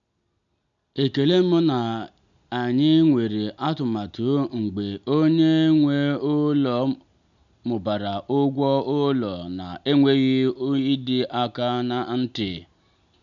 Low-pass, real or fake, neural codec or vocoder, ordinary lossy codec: 7.2 kHz; real; none; none